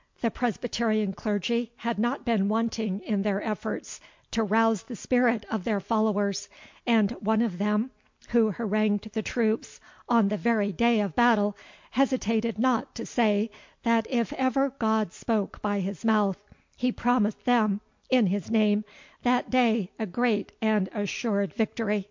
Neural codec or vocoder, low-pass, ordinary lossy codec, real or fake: none; 7.2 kHz; MP3, 48 kbps; real